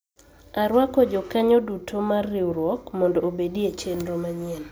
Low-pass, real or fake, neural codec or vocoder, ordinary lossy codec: none; real; none; none